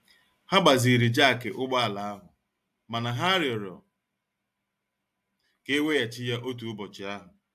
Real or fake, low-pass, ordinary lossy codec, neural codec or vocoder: real; 14.4 kHz; AAC, 96 kbps; none